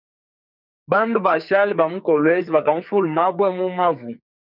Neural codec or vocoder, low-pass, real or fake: codec, 44.1 kHz, 2.6 kbps, SNAC; 5.4 kHz; fake